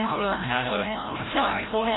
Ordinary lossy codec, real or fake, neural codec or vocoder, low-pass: AAC, 16 kbps; fake; codec, 16 kHz, 0.5 kbps, FreqCodec, larger model; 7.2 kHz